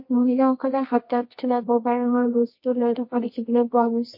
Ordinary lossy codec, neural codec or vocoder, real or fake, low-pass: MP3, 32 kbps; codec, 24 kHz, 0.9 kbps, WavTokenizer, medium music audio release; fake; 5.4 kHz